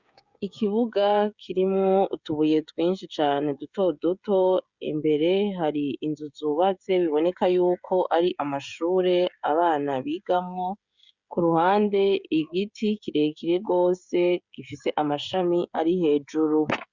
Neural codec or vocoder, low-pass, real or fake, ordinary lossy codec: codec, 16 kHz, 16 kbps, FreqCodec, smaller model; 7.2 kHz; fake; Opus, 64 kbps